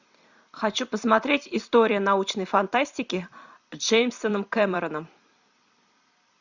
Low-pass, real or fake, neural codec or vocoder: 7.2 kHz; fake; vocoder, 44.1 kHz, 128 mel bands every 256 samples, BigVGAN v2